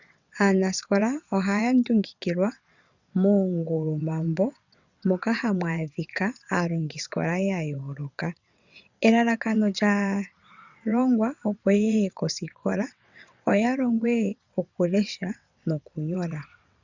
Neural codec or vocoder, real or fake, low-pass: vocoder, 22.05 kHz, 80 mel bands, WaveNeXt; fake; 7.2 kHz